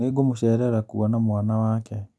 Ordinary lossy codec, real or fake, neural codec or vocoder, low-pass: none; real; none; none